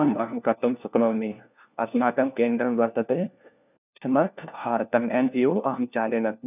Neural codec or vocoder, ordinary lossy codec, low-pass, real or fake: codec, 16 kHz, 1 kbps, FunCodec, trained on LibriTTS, 50 frames a second; none; 3.6 kHz; fake